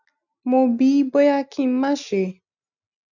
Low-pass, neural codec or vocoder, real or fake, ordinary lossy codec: 7.2 kHz; none; real; none